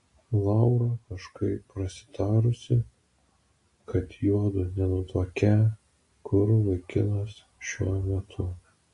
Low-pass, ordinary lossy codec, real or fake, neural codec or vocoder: 10.8 kHz; AAC, 48 kbps; real; none